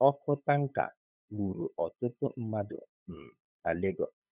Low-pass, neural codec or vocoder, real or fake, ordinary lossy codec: 3.6 kHz; codec, 16 kHz, 8 kbps, FunCodec, trained on LibriTTS, 25 frames a second; fake; none